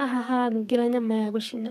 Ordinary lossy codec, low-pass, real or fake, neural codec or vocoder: none; 14.4 kHz; fake; codec, 32 kHz, 1.9 kbps, SNAC